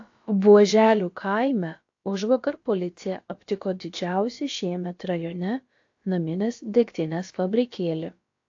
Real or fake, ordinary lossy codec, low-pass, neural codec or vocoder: fake; AAC, 48 kbps; 7.2 kHz; codec, 16 kHz, about 1 kbps, DyCAST, with the encoder's durations